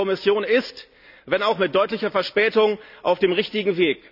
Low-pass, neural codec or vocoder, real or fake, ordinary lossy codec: 5.4 kHz; none; real; none